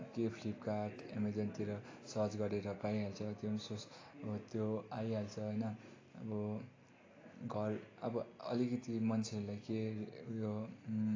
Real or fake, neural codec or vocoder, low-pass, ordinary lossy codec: real; none; 7.2 kHz; AAC, 32 kbps